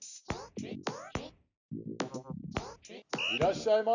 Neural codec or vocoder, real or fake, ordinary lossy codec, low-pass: none; real; none; 7.2 kHz